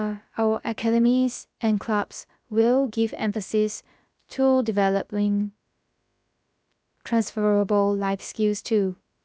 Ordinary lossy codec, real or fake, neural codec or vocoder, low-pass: none; fake; codec, 16 kHz, about 1 kbps, DyCAST, with the encoder's durations; none